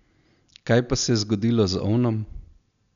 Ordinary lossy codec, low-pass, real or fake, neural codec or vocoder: none; 7.2 kHz; real; none